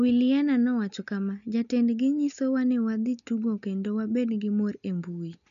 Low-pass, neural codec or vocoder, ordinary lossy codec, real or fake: 7.2 kHz; none; none; real